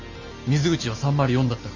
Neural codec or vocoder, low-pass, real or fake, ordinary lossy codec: none; 7.2 kHz; real; MP3, 48 kbps